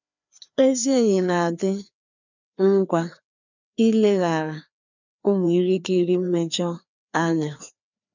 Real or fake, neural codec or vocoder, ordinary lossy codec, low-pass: fake; codec, 16 kHz, 2 kbps, FreqCodec, larger model; none; 7.2 kHz